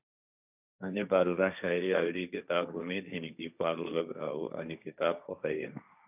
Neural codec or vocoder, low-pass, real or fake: codec, 16 kHz, 1.1 kbps, Voila-Tokenizer; 3.6 kHz; fake